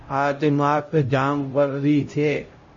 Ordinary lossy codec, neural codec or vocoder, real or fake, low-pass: MP3, 32 kbps; codec, 16 kHz, 0.5 kbps, X-Codec, HuBERT features, trained on LibriSpeech; fake; 7.2 kHz